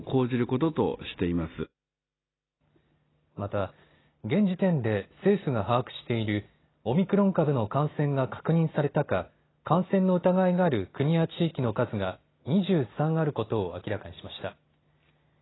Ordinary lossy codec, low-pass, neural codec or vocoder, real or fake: AAC, 16 kbps; 7.2 kHz; none; real